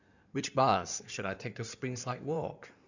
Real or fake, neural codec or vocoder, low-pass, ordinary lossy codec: fake; codec, 16 kHz in and 24 kHz out, 2.2 kbps, FireRedTTS-2 codec; 7.2 kHz; none